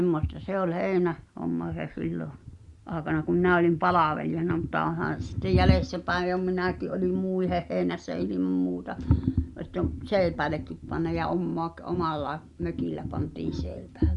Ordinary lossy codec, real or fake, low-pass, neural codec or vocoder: Opus, 64 kbps; real; 10.8 kHz; none